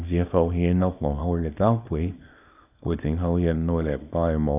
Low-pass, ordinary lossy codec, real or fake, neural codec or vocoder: 3.6 kHz; none; fake; codec, 24 kHz, 0.9 kbps, WavTokenizer, small release